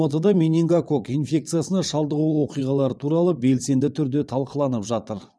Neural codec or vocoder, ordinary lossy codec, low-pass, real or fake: vocoder, 22.05 kHz, 80 mel bands, WaveNeXt; none; none; fake